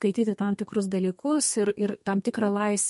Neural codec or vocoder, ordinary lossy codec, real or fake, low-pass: codec, 32 kHz, 1.9 kbps, SNAC; MP3, 48 kbps; fake; 14.4 kHz